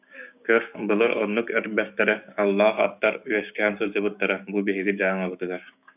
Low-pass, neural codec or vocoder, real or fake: 3.6 kHz; codec, 16 kHz, 6 kbps, DAC; fake